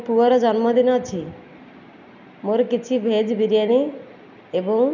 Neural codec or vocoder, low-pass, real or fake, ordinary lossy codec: none; 7.2 kHz; real; none